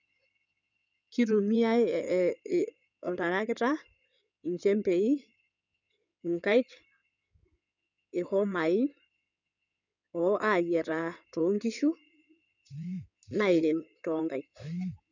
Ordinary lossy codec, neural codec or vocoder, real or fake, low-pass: none; codec, 16 kHz in and 24 kHz out, 2.2 kbps, FireRedTTS-2 codec; fake; 7.2 kHz